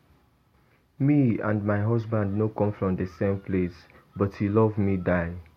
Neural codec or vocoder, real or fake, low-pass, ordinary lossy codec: none; real; 19.8 kHz; MP3, 64 kbps